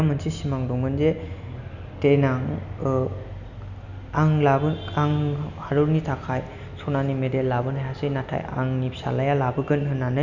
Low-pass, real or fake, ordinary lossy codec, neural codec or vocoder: 7.2 kHz; real; none; none